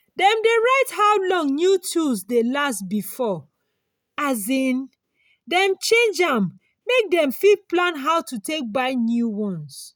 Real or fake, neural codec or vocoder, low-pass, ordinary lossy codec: real; none; none; none